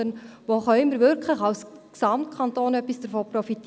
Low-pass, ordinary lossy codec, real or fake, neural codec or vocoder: none; none; real; none